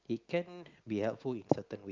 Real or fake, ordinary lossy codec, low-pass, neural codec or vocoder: real; Opus, 32 kbps; 7.2 kHz; none